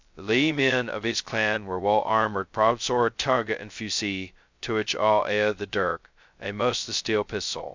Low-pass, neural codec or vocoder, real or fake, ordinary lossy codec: 7.2 kHz; codec, 16 kHz, 0.2 kbps, FocalCodec; fake; MP3, 64 kbps